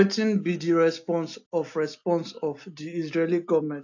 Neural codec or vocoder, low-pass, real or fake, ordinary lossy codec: none; 7.2 kHz; real; AAC, 48 kbps